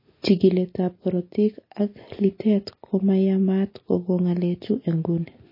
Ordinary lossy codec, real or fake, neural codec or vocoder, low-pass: MP3, 24 kbps; real; none; 5.4 kHz